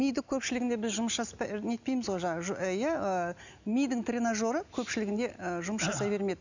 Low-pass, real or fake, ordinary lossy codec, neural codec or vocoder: 7.2 kHz; real; none; none